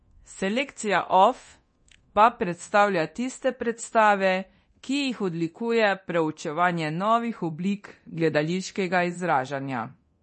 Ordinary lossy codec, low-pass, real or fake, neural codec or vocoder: MP3, 32 kbps; 10.8 kHz; fake; codec, 24 kHz, 0.9 kbps, DualCodec